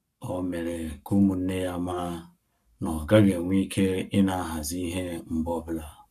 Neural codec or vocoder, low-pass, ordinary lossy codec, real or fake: codec, 44.1 kHz, 7.8 kbps, Pupu-Codec; 14.4 kHz; none; fake